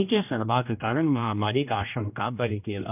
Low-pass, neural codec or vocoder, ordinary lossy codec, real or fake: 3.6 kHz; codec, 16 kHz, 1 kbps, X-Codec, HuBERT features, trained on general audio; none; fake